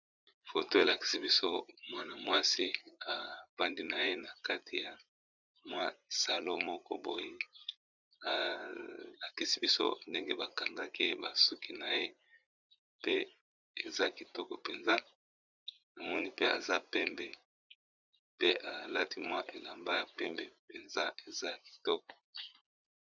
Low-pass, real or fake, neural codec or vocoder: 7.2 kHz; fake; vocoder, 44.1 kHz, 80 mel bands, Vocos